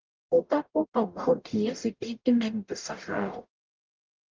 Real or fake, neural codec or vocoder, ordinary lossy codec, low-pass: fake; codec, 44.1 kHz, 0.9 kbps, DAC; Opus, 24 kbps; 7.2 kHz